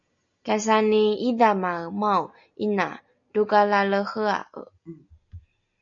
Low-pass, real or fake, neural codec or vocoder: 7.2 kHz; real; none